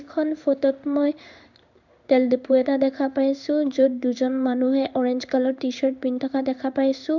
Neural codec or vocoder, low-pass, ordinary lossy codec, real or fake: codec, 16 kHz in and 24 kHz out, 1 kbps, XY-Tokenizer; 7.2 kHz; none; fake